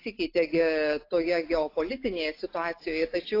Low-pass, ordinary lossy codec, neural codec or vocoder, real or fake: 5.4 kHz; AAC, 32 kbps; none; real